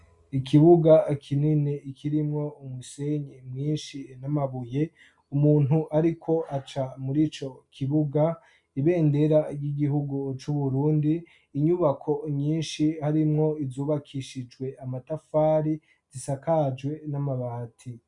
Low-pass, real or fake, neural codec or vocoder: 10.8 kHz; real; none